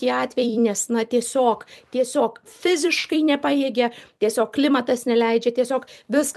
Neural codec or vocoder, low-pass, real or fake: vocoder, 44.1 kHz, 128 mel bands every 256 samples, BigVGAN v2; 14.4 kHz; fake